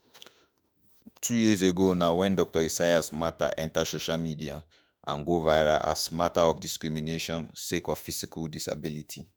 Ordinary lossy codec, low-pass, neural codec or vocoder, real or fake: none; none; autoencoder, 48 kHz, 32 numbers a frame, DAC-VAE, trained on Japanese speech; fake